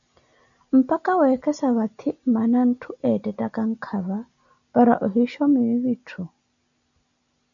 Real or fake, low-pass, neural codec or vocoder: real; 7.2 kHz; none